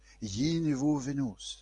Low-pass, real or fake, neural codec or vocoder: 10.8 kHz; real; none